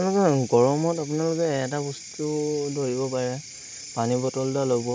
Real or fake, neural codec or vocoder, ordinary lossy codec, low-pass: real; none; none; none